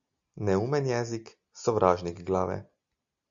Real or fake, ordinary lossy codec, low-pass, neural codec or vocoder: real; Opus, 64 kbps; 7.2 kHz; none